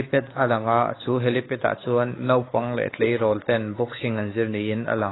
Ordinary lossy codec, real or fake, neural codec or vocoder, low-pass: AAC, 16 kbps; fake; codec, 16 kHz, 4 kbps, X-Codec, WavLM features, trained on Multilingual LibriSpeech; 7.2 kHz